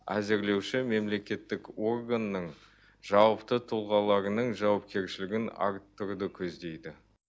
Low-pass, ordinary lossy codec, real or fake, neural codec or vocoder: none; none; real; none